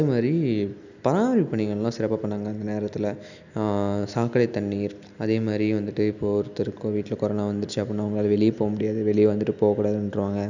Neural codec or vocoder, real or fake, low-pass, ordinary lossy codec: none; real; 7.2 kHz; none